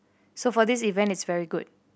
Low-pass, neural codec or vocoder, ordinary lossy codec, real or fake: none; none; none; real